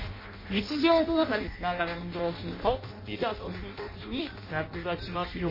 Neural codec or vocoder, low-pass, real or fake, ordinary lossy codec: codec, 16 kHz in and 24 kHz out, 0.6 kbps, FireRedTTS-2 codec; 5.4 kHz; fake; MP3, 24 kbps